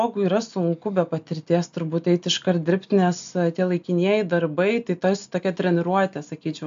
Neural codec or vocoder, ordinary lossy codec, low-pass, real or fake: none; AAC, 64 kbps; 7.2 kHz; real